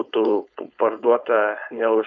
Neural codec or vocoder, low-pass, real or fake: codec, 16 kHz, 4.8 kbps, FACodec; 7.2 kHz; fake